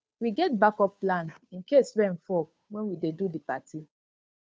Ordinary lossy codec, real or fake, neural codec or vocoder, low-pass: none; fake; codec, 16 kHz, 2 kbps, FunCodec, trained on Chinese and English, 25 frames a second; none